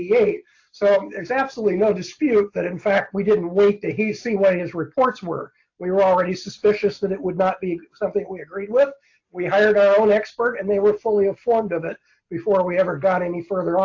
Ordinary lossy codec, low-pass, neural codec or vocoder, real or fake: AAC, 48 kbps; 7.2 kHz; none; real